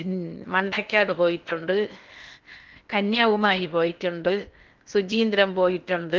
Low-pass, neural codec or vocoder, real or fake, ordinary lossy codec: 7.2 kHz; codec, 16 kHz in and 24 kHz out, 0.8 kbps, FocalCodec, streaming, 65536 codes; fake; Opus, 24 kbps